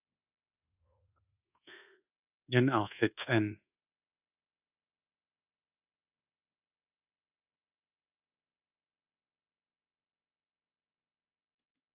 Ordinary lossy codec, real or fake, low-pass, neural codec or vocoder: AAC, 32 kbps; fake; 3.6 kHz; codec, 24 kHz, 1.2 kbps, DualCodec